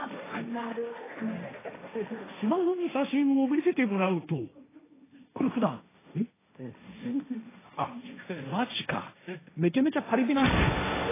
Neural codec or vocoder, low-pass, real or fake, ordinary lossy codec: codec, 16 kHz, 1.1 kbps, Voila-Tokenizer; 3.6 kHz; fake; AAC, 16 kbps